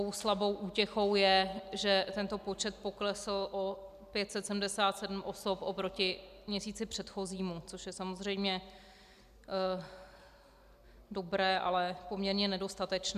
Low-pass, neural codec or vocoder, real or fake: 14.4 kHz; none; real